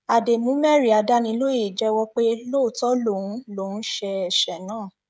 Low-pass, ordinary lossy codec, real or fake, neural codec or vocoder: none; none; fake; codec, 16 kHz, 16 kbps, FreqCodec, smaller model